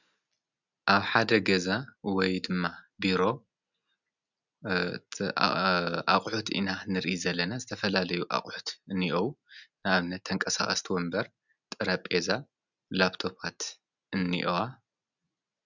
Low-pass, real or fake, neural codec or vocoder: 7.2 kHz; real; none